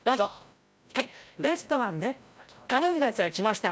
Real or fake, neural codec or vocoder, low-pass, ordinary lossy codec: fake; codec, 16 kHz, 0.5 kbps, FreqCodec, larger model; none; none